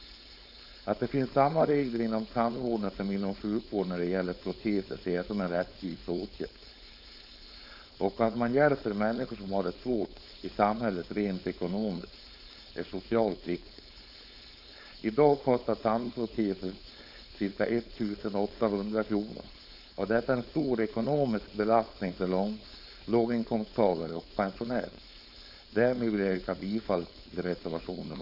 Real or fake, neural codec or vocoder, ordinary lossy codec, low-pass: fake; codec, 16 kHz, 4.8 kbps, FACodec; none; 5.4 kHz